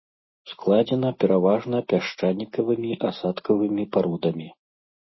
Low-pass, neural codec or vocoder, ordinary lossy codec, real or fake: 7.2 kHz; none; MP3, 24 kbps; real